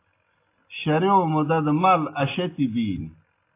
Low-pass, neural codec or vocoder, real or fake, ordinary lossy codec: 3.6 kHz; none; real; AAC, 24 kbps